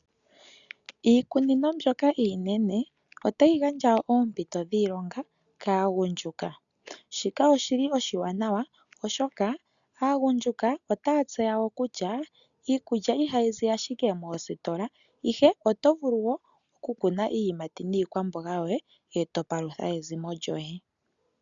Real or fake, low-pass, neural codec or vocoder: real; 7.2 kHz; none